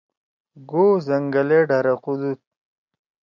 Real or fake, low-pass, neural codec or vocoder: real; 7.2 kHz; none